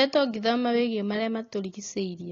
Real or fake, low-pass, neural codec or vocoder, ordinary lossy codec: real; 7.2 kHz; none; AAC, 32 kbps